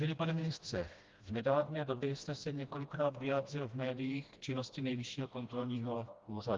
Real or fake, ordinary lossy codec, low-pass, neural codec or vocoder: fake; Opus, 32 kbps; 7.2 kHz; codec, 16 kHz, 1 kbps, FreqCodec, smaller model